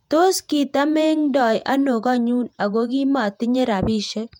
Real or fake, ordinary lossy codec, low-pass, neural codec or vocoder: fake; MP3, 96 kbps; 19.8 kHz; vocoder, 48 kHz, 128 mel bands, Vocos